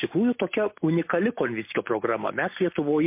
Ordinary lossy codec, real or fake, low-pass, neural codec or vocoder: MP3, 24 kbps; real; 3.6 kHz; none